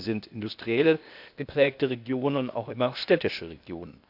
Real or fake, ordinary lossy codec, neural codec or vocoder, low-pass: fake; none; codec, 16 kHz, 0.8 kbps, ZipCodec; 5.4 kHz